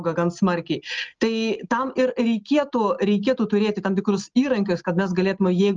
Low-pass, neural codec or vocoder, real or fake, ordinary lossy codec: 7.2 kHz; none; real; Opus, 32 kbps